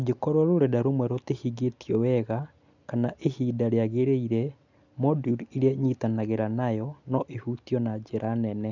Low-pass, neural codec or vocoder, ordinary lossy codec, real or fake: 7.2 kHz; none; none; real